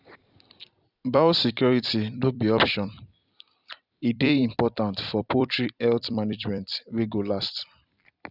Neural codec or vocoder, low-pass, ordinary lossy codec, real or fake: vocoder, 44.1 kHz, 128 mel bands every 256 samples, BigVGAN v2; 5.4 kHz; none; fake